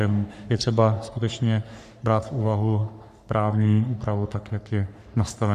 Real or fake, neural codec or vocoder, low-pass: fake; codec, 44.1 kHz, 3.4 kbps, Pupu-Codec; 14.4 kHz